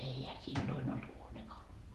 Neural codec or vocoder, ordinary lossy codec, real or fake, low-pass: vocoder, 48 kHz, 128 mel bands, Vocos; Opus, 16 kbps; fake; 14.4 kHz